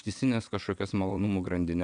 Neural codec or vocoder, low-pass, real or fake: vocoder, 22.05 kHz, 80 mel bands, WaveNeXt; 9.9 kHz; fake